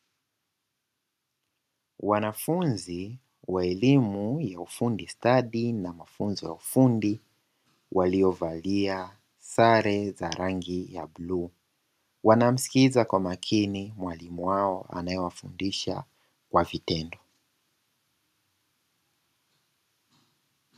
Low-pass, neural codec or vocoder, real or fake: 14.4 kHz; none; real